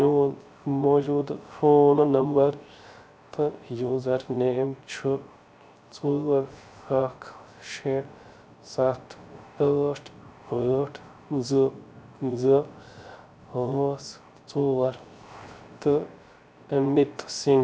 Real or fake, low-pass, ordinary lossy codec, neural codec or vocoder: fake; none; none; codec, 16 kHz, 0.3 kbps, FocalCodec